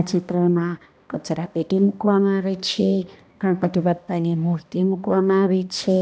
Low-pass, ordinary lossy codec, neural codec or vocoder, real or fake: none; none; codec, 16 kHz, 1 kbps, X-Codec, HuBERT features, trained on balanced general audio; fake